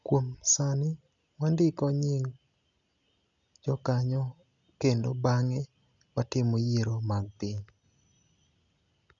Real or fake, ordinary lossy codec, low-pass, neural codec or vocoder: real; none; 7.2 kHz; none